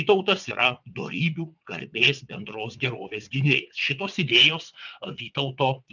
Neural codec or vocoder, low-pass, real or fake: vocoder, 22.05 kHz, 80 mel bands, WaveNeXt; 7.2 kHz; fake